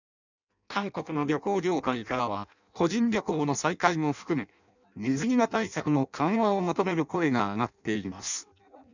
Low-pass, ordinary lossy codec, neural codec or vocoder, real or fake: 7.2 kHz; none; codec, 16 kHz in and 24 kHz out, 0.6 kbps, FireRedTTS-2 codec; fake